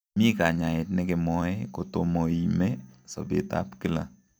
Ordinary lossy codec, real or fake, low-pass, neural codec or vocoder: none; real; none; none